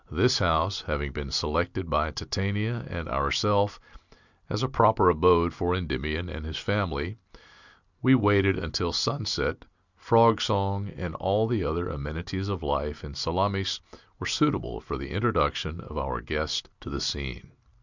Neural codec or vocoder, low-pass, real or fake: none; 7.2 kHz; real